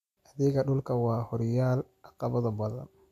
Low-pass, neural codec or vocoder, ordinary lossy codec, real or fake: 14.4 kHz; none; none; real